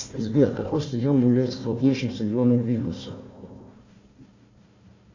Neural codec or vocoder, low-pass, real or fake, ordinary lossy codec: codec, 16 kHz, 1 kbps, FunCodec, trained on Chinese and English, 50 frames a second; 7.2 kHz; fake; AAC, 48 kbps